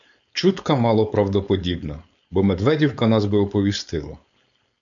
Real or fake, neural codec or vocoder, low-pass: fake; codec, 16 kHz, 4.8 kbps, FACodec; 7.2 kHz